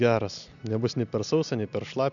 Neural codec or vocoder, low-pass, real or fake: none; 7.2 kHz; real